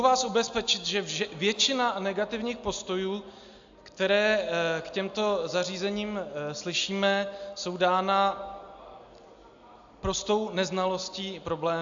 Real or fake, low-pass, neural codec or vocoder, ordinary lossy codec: real; 7.2 kHz; none; AAC, 64 kbps